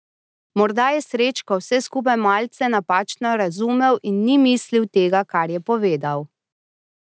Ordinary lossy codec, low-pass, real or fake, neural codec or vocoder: none; none; real; none